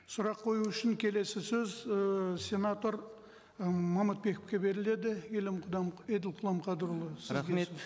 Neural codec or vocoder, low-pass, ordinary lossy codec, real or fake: none; none; none; real